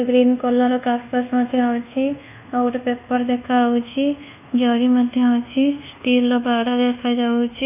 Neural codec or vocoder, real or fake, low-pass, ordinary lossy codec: codec, 24 kHz, 1.2 kbps, DualCodec; fake; 3.6 kHz; none